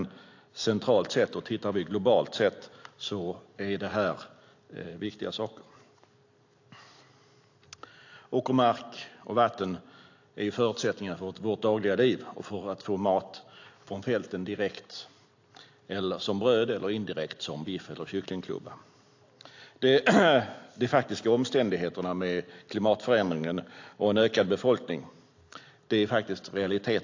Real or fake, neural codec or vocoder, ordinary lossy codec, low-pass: real; none; AAC, 48 kbps; 7.2 kHz